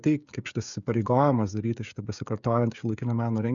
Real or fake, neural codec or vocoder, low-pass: fake; codec, 16 kHz, 16 kbps, FreqCodec, smaller model; 7.2 kHz